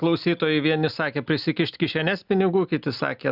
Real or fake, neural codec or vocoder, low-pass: real; none; 5.4 kHz